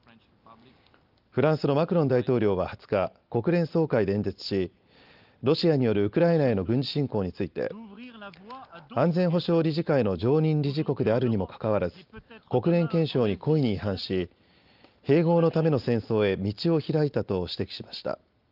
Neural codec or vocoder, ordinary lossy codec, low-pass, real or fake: none; Opus, 32 kbps; 5.4 kHz; real